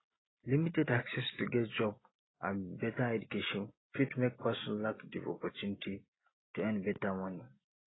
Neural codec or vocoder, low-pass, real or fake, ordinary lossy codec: none; 7.2 kHz; real; AAC, 16 kbps